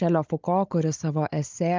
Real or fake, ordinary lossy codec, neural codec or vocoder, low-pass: fake; Opus, 32 kbps; codec, 16 kHz, 16 kbps, FunCodec, trained on Chinese and English, 50 frames a second; 7.2 kHz